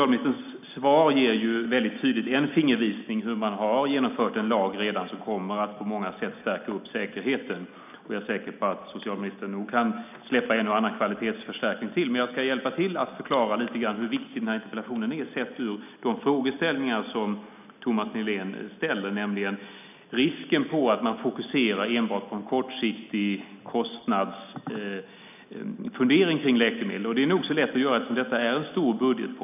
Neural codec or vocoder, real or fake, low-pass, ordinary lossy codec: none; real; 3.6 kHz; none